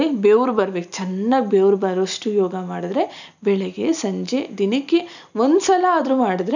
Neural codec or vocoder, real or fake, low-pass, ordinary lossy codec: none; real; 7.2 kHz; none